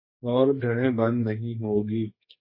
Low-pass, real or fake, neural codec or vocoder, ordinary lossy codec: 5.4 kHz; fake; codec, 44.1 kHz, 2.6 kbps, SNAC; MP3, 24 kbps